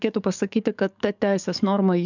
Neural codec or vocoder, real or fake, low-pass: codec, 44.1 kHz, 7.8 kbps, DAC; fake; 7.2 kHz